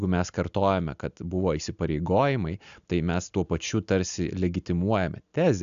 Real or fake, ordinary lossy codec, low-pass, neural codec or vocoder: real; AAC, 96 kbps; 7.2 kHz; none